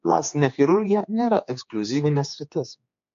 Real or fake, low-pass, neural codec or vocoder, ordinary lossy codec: fake; 7.2 kHz; codec, 16 kHz, 2 kbps, X-Codec, HuBERT features, trained on general audio; MP3, 48 kbps